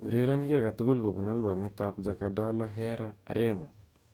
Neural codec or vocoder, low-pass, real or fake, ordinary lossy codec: codec, 44.1 kHz, 2.6 kbps, DAC; 19.8 kHz; fake; none